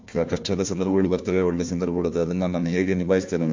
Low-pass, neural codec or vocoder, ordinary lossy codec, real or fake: 7.2 kHz; codec, 16 kHz, 1 kbps, FunCodec, trained on Chinese and English, 50 frames a second; MP3, 48 kbps; fake